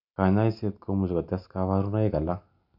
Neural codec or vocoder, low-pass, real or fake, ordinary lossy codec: none; 5.4 kHz; real; none